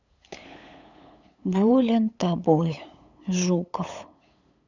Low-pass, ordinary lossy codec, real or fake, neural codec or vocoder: 7.2 kHz; none; fake; codec, 16 kHz, 8 kbps, FunCodec, trained on LibriTTS, 25 frames a second